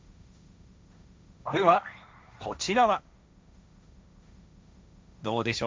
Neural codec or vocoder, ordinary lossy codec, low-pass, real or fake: codec, 16 kHz, 1.1 kbps, Voila-Tokenizer; none; none; fake